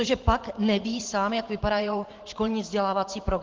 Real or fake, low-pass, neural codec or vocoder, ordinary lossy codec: fake; 7.2 kHz; vocoder, 44.1 kHz, 128 mel bands every 512 samples, BigVGAN v2; Opus, 16 kbps